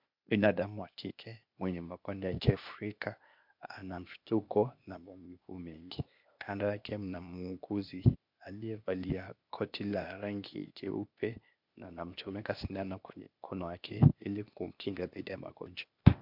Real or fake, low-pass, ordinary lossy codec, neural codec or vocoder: fake; 5.4 kHz; MP3, 48 kbps; codec, 16 kHz, 0.8 kbps, ZipCodec